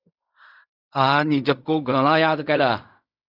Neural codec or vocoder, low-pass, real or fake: codec, 16 kHz in and 24 kHz out, 0.4 kbps, LongCat-Audio-Codec, fine tuned four codebook decoder; 5.4 kHz; fake